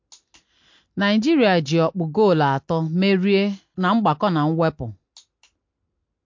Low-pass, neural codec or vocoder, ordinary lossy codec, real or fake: 7.2 kHz; none; MP3, 48 kbps; real